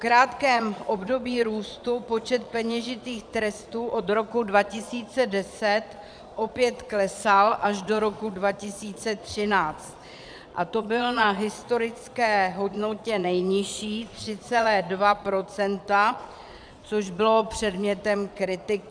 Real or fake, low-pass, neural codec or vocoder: fake; 9.9 kHz; vocoder, 22.05 kHz, 80 mel bands, Vocos